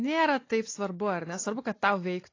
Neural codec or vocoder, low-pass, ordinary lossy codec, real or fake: none; 7.2 kHz; AAC, 32 kbps; real